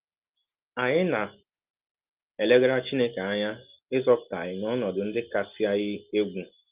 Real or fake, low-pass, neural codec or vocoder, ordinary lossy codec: real; 3.6 kHz; none; Opus, 32 kbps